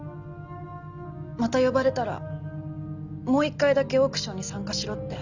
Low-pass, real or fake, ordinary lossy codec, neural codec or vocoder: 7.2 kHz; real; Opus, 64 kbps; none